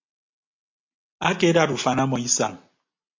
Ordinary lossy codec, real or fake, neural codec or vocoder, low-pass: MP3, 48 kbps; real; none; 7.2 kHz